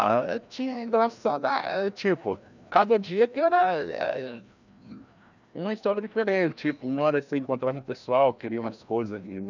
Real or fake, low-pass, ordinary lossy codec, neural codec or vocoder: fake; 7.2 kHz; none; codec, 16 kHz, 1 kbps, FreqCodec, larger model